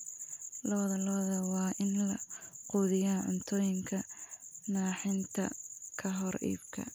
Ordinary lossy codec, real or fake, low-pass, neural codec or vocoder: none; real; none; none